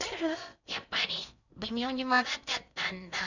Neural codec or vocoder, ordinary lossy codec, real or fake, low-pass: codec, 16 kHz in and 24 kHz out, 0.6 kbps, FocalCodec, streaming, 4096 codes; none; fake; 7.2 kHz